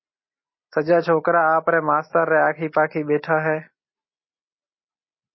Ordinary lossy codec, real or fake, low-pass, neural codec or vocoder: MP3, 24 kbps; real; 7.2 kHz; none